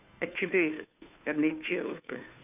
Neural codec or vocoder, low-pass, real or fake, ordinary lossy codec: codec, 16 kHz, 2 kbps, FunCodec, trained on Chinese and English, 25 frames a second; 3.6 kHz; fake; none